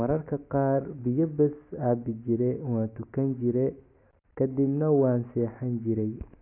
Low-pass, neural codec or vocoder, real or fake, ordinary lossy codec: 3.6 kHz; none; real; AAC, 24 kbps